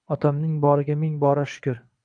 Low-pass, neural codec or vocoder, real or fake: 9.9 kHz; codec, 24 kHz, 6 kbps, HILCodec; fake